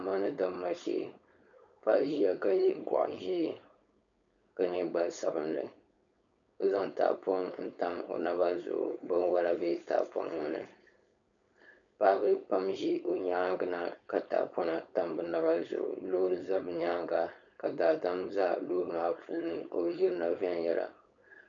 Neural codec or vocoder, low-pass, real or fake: codec, 16 kHz, 4.8 kbps, FACodec; 7.2 kHz; fake